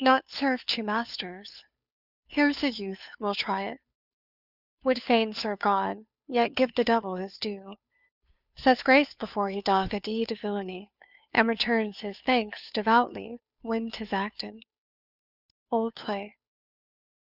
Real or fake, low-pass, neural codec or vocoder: fake; 5.4 kHz; codec, 16 kHz, 2 kbps, FunCodec, trained on Chinese and English, 25 frames a second